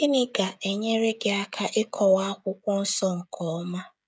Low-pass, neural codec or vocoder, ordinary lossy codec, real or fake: none; codec, 16 kHz, 16 kbps, FreqCodec, smaller model; none; fake